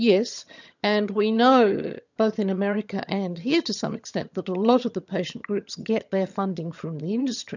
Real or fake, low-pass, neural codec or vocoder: fake; 7.2 kHz; vocoder, 22.05 kHz, 80 mel bands, HiFi-GAN